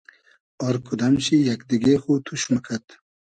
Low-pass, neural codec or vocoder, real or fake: 9.9 kHz; none; real